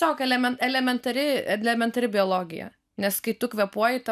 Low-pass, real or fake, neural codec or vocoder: 14.4 kHz; real; none